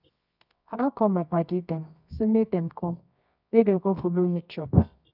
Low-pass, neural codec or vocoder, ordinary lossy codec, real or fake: 5.4 kHz; codec, 24 kHz, 0.9 kbps, WavTokenizer, medium music audio release; none; fake